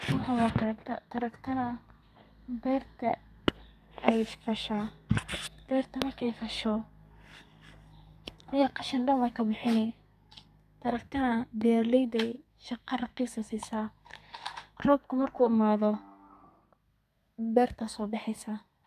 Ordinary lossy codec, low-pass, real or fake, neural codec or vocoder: none; 14.4 kHz; fake; codec, 32 kHz, 1.9 kbps, SNAC